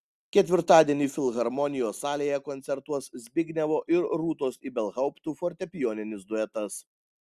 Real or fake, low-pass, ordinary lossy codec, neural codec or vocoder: real; 14.4 kHz; Opus, 64 kbps; none